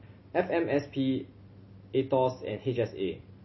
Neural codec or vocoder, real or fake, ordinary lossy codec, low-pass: none; real; MP3, 24 kbps; 7.2 kHz